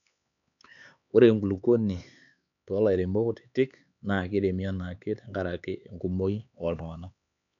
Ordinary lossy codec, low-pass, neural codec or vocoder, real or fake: none; 7.2 kHz; codec, 16 kHz, 4 kbps, X-Codec, HuBERT features, trained on LibriSpeech; fake